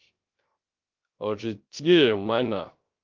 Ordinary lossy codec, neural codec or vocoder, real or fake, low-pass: Opus, 24 kbps; codec, 16 kHz, 0.3 kbps, FocalCodec; fake; 7.2 kHz